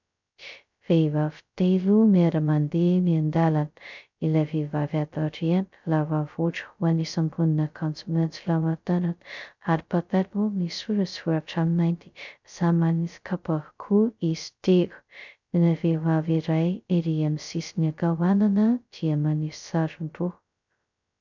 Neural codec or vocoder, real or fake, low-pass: codec, 16 kHz, 0.2 kbps, FocalCodec; fake; 7.2 kHz